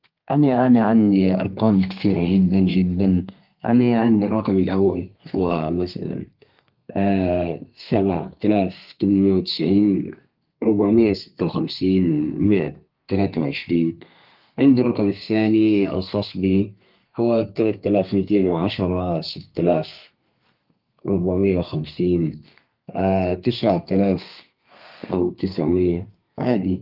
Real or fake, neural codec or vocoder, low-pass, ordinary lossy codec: fake; codec, 32 kHz, 1.9 kbps, SNAC; 5.4 kHz; Opus, 32 kbps